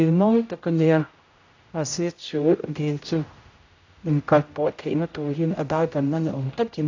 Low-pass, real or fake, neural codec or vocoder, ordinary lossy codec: 7.2 kHz; fake; codec, 16 kHz, 0.5 kbps, X-Codec, HuBERT features, trained on general audio; AAC, 48 kbps